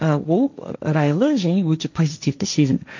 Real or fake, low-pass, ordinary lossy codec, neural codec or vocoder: fake; 7.2 kHz; none; codec, 16 kHz, 1.1 kbps, Voila-Tokenizer